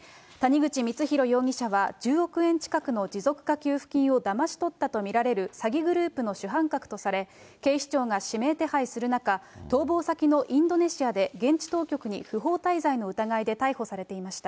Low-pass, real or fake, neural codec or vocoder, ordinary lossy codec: none; real; none; none